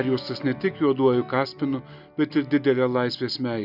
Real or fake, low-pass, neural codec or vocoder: real; 5.4 kHz; none